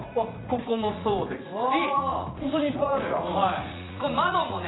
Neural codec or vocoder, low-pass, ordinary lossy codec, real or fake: vocoder, 44.1 kHz, 80 mel bands, Vocos; 7.2 kHz; AAC, 16 kbps; fake